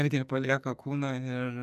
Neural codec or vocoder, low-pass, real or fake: codec, 44.1 kHz, 2.6 kbps, SNAC; 14.4 kHz; fake